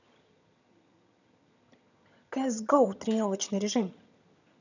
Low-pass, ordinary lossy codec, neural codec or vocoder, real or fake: 7.2 kHz; none; vocoder, 22.05 kHz, 80 mel bands, HiFi-GAN; fake